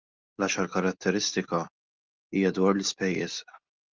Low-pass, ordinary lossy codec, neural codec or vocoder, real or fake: 7.2 kHz; Opus, 32 kbps; none; real